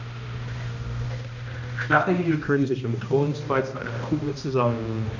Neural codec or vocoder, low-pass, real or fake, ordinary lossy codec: codec, 16 kHz, 1 kbps, X-Codec, HuBERT features, trained on balanced general audio; 7.2 kHz; fake; none